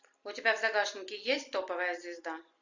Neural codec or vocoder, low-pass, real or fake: none; 7.2 kHz; real